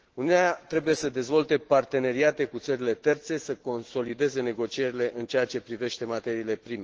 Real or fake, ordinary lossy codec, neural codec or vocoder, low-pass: fake; Opus, 16 kbps; autoencoder, 48 kHz, 128 numbers a frame, DAC-VAE, trained on Japanese speech; 7.2 kHz